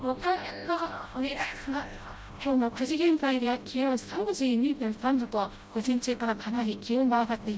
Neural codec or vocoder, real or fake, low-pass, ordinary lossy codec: codec, 16 kHz, 0.5 kbps, FreqCodec, smaller model; fake; none; none